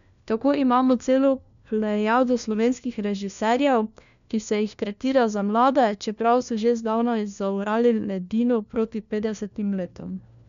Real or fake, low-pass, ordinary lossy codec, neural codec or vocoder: fake; 7.2 kHz; none; codec, 16 kHz, 1 kbps, FunCodec, trained on LibriTTS, 50 frames a second